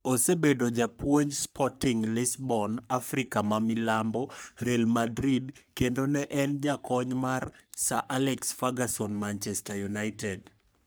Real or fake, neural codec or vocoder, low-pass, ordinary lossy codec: fake; codec, 44.1 kHz, 3.4 kbps, Pupu-Codec; none; none